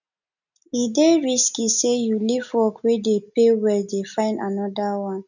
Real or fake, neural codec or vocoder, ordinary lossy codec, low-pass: real; none; none; 7.2 kHz